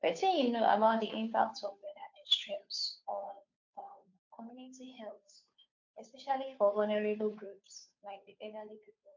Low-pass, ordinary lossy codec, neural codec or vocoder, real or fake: 7.2 kHz; MP3, 48 kbps; codec, 16 kHz, 2 kbps, FunCodec, trained on Chinese and English, 25 frames a second; fake